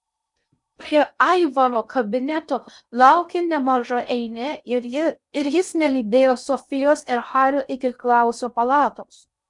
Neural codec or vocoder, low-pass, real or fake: codec, 16 kHz in and 24 kHz out, 0.6 kbps, FocalCodec, streaming, 4096 codes; 10.8 kHz; fake